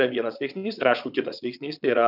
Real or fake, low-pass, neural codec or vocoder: fake; 5.4 kHz; vocoder, 22.05 kHz, 80 mel bands, Vocos